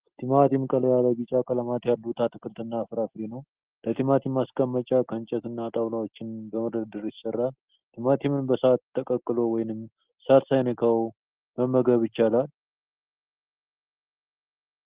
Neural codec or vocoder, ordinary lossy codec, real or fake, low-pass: none; Opus, 16 kbps; real; 3.6 kHz